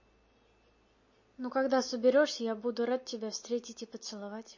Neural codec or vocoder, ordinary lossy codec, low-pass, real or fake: none; MP3, 32 kbps; 7.2 kHz; real